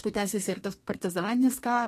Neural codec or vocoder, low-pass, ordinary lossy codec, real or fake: codec, 32 kHz, 1.9 kbps, SNAC; 14.4 kHz; AAC, 48 kbps; fake